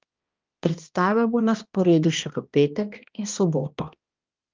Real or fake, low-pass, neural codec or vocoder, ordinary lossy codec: fake; 7.2 kHz; codec, 16 kHz, 1 kbps, X-Codec, HuBERT features, trained on balanced general audio; Opus, 32 kbps